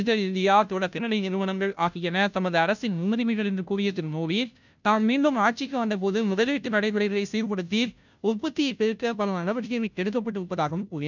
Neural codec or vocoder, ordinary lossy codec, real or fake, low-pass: codec, 16 kHz, 0.5 kbps, FunCodec, trained on Chinese and English, 25 frames a second; none; fake; 7.2 kHz